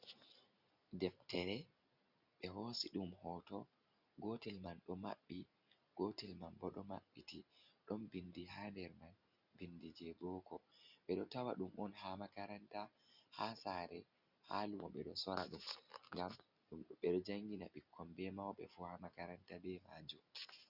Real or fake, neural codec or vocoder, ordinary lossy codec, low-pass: real; none; Opus, 64 kbps; 5.4 kHz